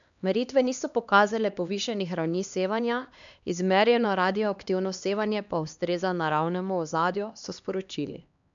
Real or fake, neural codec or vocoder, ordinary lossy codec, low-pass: fake; codec, 16 kHz, 2 kbps, X-Codec, HuBERT features, trained on LibriSpeech; none; 7.2 kHz